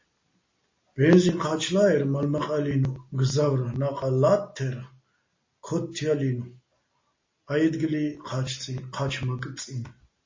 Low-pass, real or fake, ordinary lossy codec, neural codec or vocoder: 7.2 kHz; real; MP3, 32 kbps; none